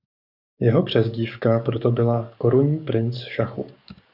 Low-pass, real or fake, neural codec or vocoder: 5.4 kHz; fake; codec, 44.1 kHz, 7.8 kbps, DAC